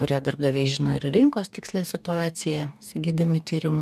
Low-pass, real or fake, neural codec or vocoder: 14.4 kHz; fake; codec, 44.1 kHz, 2.6 kbps, DAC